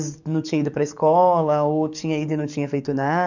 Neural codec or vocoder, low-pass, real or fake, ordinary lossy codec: codec, 44.1 kHz, 7.8 kbps, DAC; 7.2 kHz; fake; none